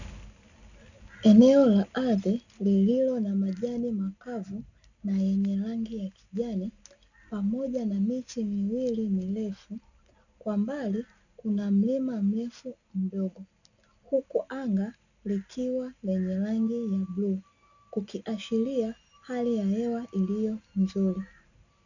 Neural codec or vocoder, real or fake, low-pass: none; real; 7.2 kHz